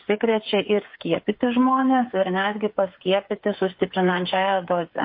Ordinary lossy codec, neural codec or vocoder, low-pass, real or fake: MP3, 32 kbps; codec, 16 kHz, 8 kbps, FreqCodec, smaller model; 5.4 kHz; fake